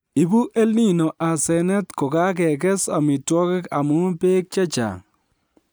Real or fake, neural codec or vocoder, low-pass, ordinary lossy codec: real; none; none; none